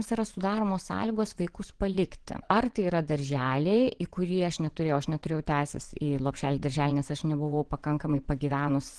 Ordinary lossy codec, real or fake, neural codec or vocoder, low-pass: Opus, 16 kbps; fake; vocoder, 22.05 kHz, 80 mel bands, WaveNeXt; 9.9 kHz